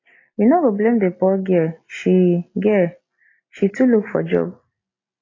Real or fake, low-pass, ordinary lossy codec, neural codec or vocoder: real; 7.2 kHz; AAC, 32 kbps; none